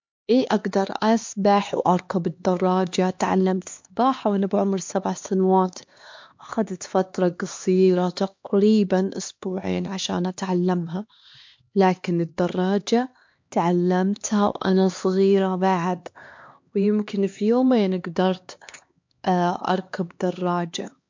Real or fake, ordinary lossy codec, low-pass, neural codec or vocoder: fake; MP3, 48 kbps; 7.2 kHz; codec, 16 kHz, 2 kbps, X-Codec, HuBERT features, trained on LibriSpeech